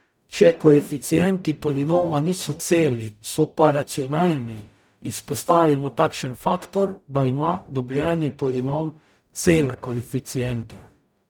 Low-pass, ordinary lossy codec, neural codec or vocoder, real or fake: none; none; codec, 44.1 kHz, 0.9 kbps, DAC; fake